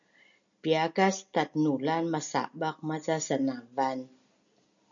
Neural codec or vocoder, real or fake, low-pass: none; real; 7.2 kHz